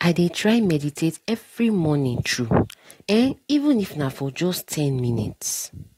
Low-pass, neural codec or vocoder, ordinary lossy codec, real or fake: 19.8 kHz; none; AAC, 48 kbps; real